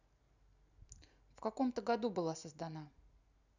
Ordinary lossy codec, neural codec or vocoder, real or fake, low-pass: none; none; real; 7.2 kHz